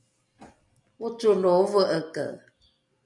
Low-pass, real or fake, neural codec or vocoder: 10.8 kHz; real; none